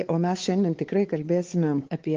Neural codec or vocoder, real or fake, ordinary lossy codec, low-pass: codec, 16 kHz, 4 kbps, X-Codec, WavLM features, trained on Multilingual LibriSpeech; fake; Opus, 16 kbps; 7.2 kHz